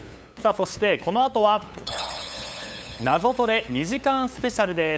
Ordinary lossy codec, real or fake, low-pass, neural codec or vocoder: none; fake; none; codec, 16 kHz, 8 kbps, FunCodec, trained on LibriTTS, 25 frames a second